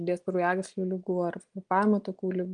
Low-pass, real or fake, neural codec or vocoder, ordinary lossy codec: 9.9 kHz; real; none; Opus, 32 kbps